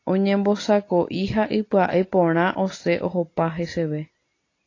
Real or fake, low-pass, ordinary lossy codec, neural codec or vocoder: real; 7.2 kHz; AAC, 32 kbps; none